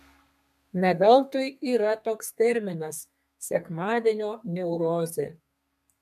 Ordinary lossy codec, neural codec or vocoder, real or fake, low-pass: MP3, 96 kbps; codec, 32 kHz, 1.9 kbps, SNAC; fake; 14.4 kHz